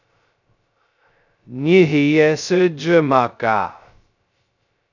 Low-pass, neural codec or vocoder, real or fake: 7.2 kHz; codec, 16 kHz, 0.2 kbps, FocalCodec; fake